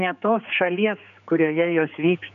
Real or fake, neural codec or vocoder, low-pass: fake; codec, 16 kHz, 4 kbps, X-Codec, HuBERT features, trained on general audio; 7.2 kHz